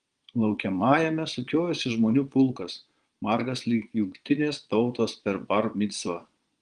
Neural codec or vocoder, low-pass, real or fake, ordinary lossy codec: vocoder, 22.05 kHz, 80 mel bands, Vocos; 9.9 kHz; fake; Opus, 24 kbps